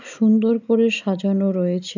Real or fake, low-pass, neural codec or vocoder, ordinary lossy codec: real; 7.2 kHz; none; none